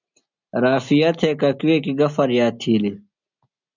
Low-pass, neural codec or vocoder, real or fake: 7.2 kHz; none; real